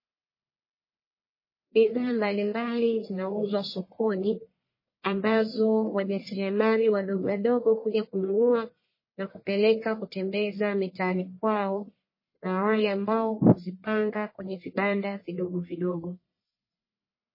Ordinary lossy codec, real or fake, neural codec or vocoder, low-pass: MP3, 24 kbps; fake; codec, 44.1 kHz, 1.7 kbps, Pupu-Codec; 5.4 kHz